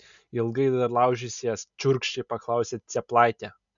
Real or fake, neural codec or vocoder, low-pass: real; none; 7.2 kHz